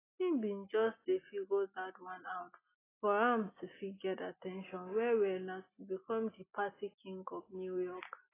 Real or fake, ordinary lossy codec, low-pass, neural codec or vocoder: real; AAC, 16 kbps; 3.6 kHz; none